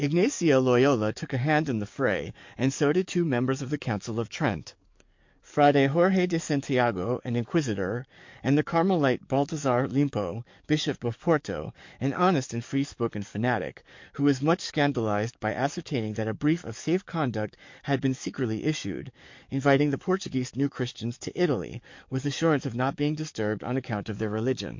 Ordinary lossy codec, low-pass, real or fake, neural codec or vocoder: MP3, 48 kbps; 7.2 kHz; fake; codec, 44.1 kHz, 7.8 kbps, DAC